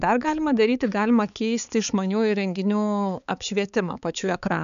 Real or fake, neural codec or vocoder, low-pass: fake; codec, 16 kHz, 4 kbps, X-Codec, HuBERT features, trained on balanced general audio; 7.2 kHz